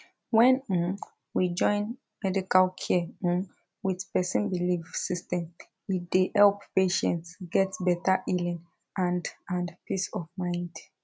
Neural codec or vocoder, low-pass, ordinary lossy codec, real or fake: none; none; none; real